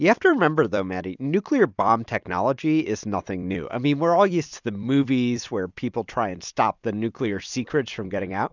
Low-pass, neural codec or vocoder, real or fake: 7.2 kHz; vocoder, 44.1 kHz, 128 mel bands every 256 samples, BigVGAN v2; fake